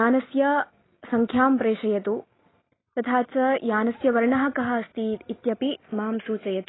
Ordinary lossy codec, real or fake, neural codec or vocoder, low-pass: AAC, 16 kbps; real; none; 7.2 kHz